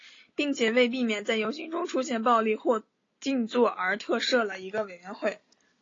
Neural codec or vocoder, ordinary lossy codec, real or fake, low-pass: none; AAC, 32 kbps; real; 7.2 kHz